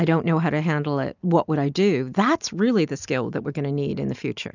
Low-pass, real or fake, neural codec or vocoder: 7.2 kHz; real; none